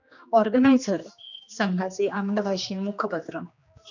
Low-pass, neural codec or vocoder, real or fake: 7.2 kHz; codec, 16 kHz, 2 kbps, X-Codec, HuBERT features, trained on general audio; fake